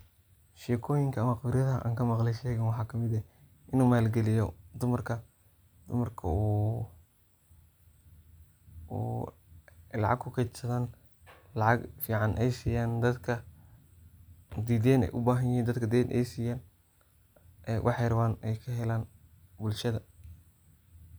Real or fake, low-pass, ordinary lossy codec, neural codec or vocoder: real; none; none; none